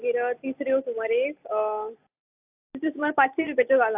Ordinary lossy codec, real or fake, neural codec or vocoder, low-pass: AAC, 32 kbps; real; none; 3.6 kHz